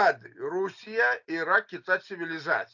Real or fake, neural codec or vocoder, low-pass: real; none; 7.2 kHz